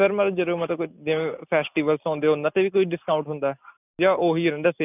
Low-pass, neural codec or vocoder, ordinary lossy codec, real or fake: 3.6 kHz; none; none; real